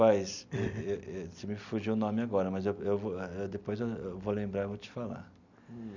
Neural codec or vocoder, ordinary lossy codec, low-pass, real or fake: none; none; 7.2 kHz; real